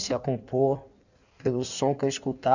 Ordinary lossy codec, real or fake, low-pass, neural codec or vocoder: none; fake; 7.2 kHz; codec, 16 kHz in and 24 kHz out, 1.1 kbps, FireRedTTS-2 codec